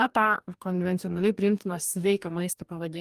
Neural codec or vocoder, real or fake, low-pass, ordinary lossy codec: codec, 44.1 kHz, 2.6 kbps, DAC; fake; 14.4 kHz; Opus, 32 kbps